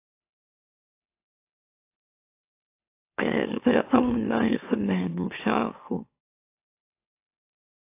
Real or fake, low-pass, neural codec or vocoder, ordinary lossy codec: fake; 3.6 kHz; autoencoder, 44.1 kHz, a latent of 192 numbers a frame, MeloTTS; AAC, 24 kbps